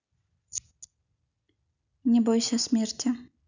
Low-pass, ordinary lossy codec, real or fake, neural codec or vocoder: 7.2 kHz; none; real; none